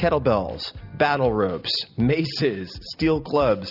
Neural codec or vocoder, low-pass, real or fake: none; 5.4 kHz; real